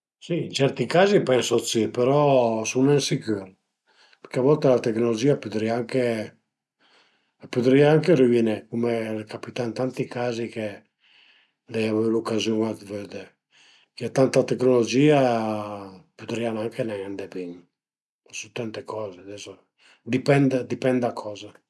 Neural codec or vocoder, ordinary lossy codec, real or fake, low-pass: none; none; real; none